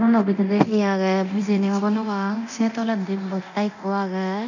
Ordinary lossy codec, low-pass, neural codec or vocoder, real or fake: none; 7.2 kHz; codec, 24 kHz, 0.9 kbps, DualCodec; fake